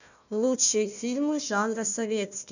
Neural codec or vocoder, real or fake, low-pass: codec, 16 kHz, 1 kbps, FunCodec, trained on Chinese and English, 50 frames a second; fake; 7.2 kHz